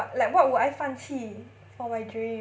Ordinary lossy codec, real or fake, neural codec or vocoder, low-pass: none; real; none; none